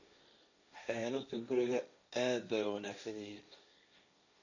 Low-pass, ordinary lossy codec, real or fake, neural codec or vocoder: 7.2 kHz; none; fake; codec, 16 kHz, 1.1 kbps, Voila-Tokenizer